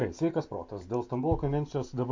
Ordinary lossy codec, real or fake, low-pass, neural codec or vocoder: MP3, 48 kbps; real; 7.2 kHz; none